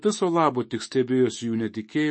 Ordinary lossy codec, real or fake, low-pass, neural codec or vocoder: MP3, 32 kbps; fake; 9.9 kHz; autoencoder, 48 kHz, 128 numbers a frame, DAC-VAE, trained on Japanese speech